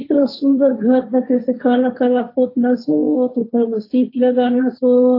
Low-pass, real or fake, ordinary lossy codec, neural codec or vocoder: 5.4 kHz; fake; AAC, 32 kbps; codec, 16 kHz, 1.1 kbps, Voila-Tokenizer